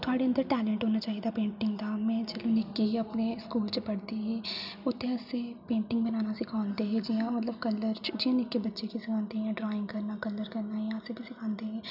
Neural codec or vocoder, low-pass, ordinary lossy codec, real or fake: none; 5.4 kHz; none; real